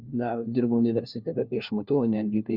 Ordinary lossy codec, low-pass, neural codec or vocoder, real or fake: Opus, 64 kbps; 5.4 kHz; codec, 16 kHz, 1 kbps, FunCodec, trained on LibriTTS, 50 frames a second; fake